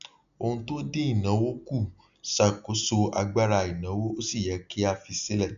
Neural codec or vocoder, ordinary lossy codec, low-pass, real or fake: none; none; 7.2 kHz; real